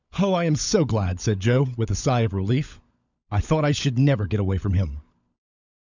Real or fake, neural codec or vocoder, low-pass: fake; codec, 16 kHz, 16 kbps, FunCodec, trained on LibriTTS, 50 frames a second; 7.2 kHz